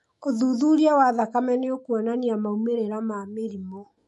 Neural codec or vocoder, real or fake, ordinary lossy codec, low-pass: autoencoder, 48 kHz, 128 numbers a frame, DAC-VAE, trained on Japanese speech; fake; MP3, 48 kbps; 14.4 kHz